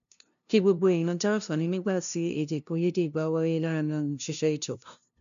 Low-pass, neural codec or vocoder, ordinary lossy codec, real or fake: 7.2 kHz; codec, 16 kHz, 0.5 kbps, FunCodec, trained on LibriTTS, 25 frames a second; none; fake